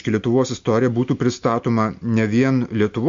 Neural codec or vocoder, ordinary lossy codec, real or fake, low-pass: none; MP3, 48 kbps; real; 7.2 kHz